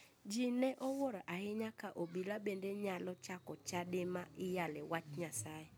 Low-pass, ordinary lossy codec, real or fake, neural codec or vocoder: none; none; real; none